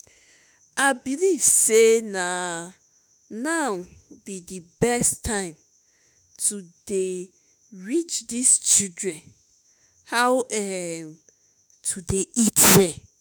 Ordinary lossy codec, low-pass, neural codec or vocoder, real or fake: none; none; autoencoder, 48 kHz, 32 numbers a frame, DAC-VAE, trained on Japanese speech; fake